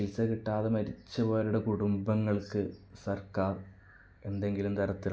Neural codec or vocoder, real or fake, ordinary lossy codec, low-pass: none; real; none; none